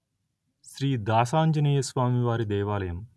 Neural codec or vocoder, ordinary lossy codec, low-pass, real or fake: none; none; none; real